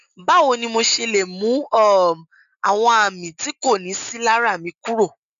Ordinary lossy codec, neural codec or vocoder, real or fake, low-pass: none; none; real; 7.2 kHz